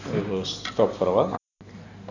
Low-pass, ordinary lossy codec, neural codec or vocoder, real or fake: 7.2 kHz; none; none; real